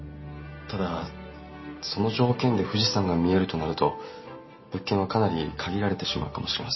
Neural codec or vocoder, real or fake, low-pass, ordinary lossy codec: none; real; 7.2 kHz; MP3, 24 kbps